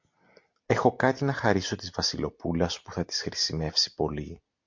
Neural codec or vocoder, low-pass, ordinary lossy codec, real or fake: none; 7.2 kHz; MP3, 48 kbps; real